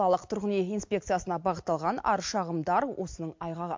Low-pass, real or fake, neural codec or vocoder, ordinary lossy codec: 7.2 kHz; real; none; MP3, 48 kbps